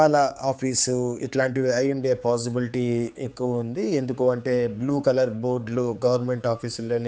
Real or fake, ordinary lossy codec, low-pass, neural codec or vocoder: fake; none; none; codec, 16 kHz, 4 kbps, X-Codec, HuBERT features, trained on general audio